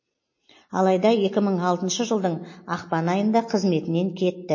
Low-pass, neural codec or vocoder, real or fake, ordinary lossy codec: 7.2 kHz; none; real; MP3, 32 kbps